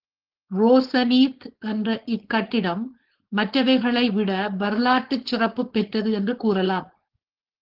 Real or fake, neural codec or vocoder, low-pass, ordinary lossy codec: fake; codec, 16 kHz, 4.8 kbps, FACodec; 5.4 kHz; Opus, 16 kbps